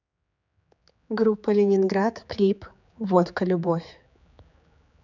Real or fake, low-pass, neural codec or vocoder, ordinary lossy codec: fake; 7.2 kHz; codec, 16 kHz, 4 kbps, X-Codec, HuBERT features, trained on general audio; none